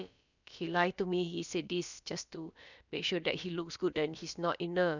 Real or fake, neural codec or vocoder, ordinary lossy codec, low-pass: fake; codec, 16 kHz, about 1 kbps, DyCAST, with the encoder's durations; none; 7.2 kHz